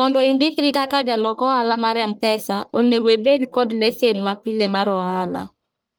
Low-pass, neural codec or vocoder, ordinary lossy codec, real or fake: none; codec, 44.1 kHz, 1.7 kbps, Pupu-Codec; none; fake